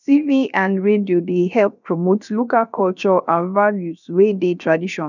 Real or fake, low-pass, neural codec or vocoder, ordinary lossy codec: fake; 7.2 kHz; codec, 16 kHz, 0.7 kbps, FocalCodec; none